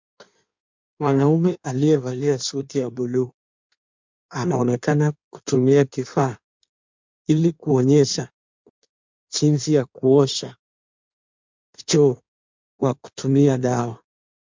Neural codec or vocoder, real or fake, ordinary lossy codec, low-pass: codec, 16 kHz in and 24 kHz out, 1.1 kbps, FireRedTTS-2 codec; fake; MP3, 64 kbps; 7.2 kHz